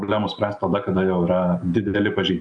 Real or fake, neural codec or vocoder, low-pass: real; none; 9.9 kHz